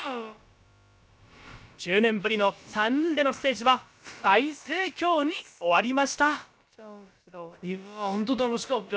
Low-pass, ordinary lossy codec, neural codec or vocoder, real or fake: none; none; codec, 16 kHz, about 1 kbps, DyCAST, with the encoder's durations; fake